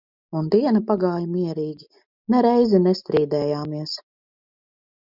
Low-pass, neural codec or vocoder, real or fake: 5.4 kHz; none; real